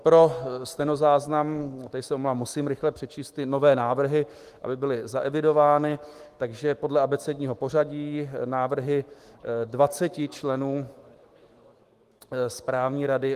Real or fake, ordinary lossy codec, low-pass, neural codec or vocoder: fake; Opus, 24 kbps; 14.4 kHz; autoencoder, 48 kHz, 128 numbers a frame, DAC-VAE, trained on Japanese speech